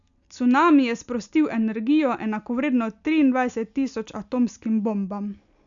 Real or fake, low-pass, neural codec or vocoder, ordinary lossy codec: real; 7.2 kHz; none; none